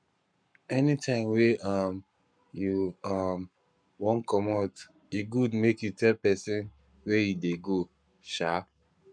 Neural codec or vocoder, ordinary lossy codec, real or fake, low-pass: codec, 44.1 kHz, 7.8 kbps, Pupu-Codec; none; fake; 9.9 kHz